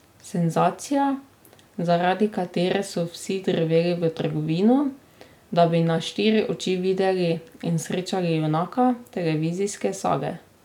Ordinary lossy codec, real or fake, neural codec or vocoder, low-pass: none; real; none; 19.8 kHz